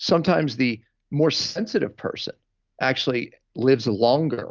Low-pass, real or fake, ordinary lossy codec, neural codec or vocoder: 7.2 kHz; real; Opus, 24 kbps; none